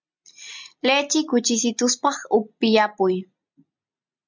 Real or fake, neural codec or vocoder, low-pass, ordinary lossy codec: real; none; 7.2 kHz; MP3, 64 kbps